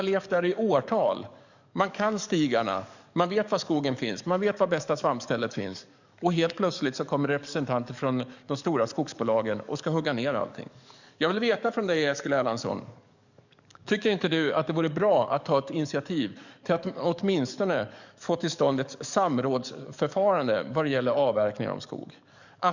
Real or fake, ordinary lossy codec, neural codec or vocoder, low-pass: fake; none; codec, 44.1 kHz, 7.8 kbps, DAC; 7.2 kHz